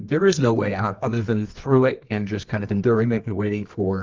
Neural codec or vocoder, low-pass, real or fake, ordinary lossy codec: codec, 24 kHz, 0.9 kbps, WavTokenizer, medium music audio release; 7.2 kHz; fake; Opus, 32 kbps